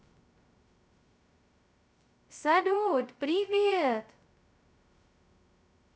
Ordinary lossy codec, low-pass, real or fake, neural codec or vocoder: none; none; fake; codec, 16 kHz, 0.2 kbps, FocalCodec